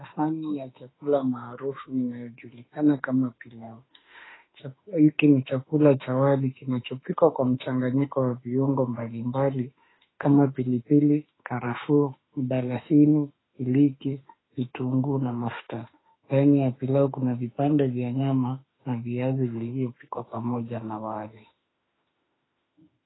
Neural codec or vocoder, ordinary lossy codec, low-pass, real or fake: autoencoder, 48 kHz, 32 numbers a frame, DAC-VAE, trained on Japanese speech; AAC, 16 kbps; 7.2 kHz; fake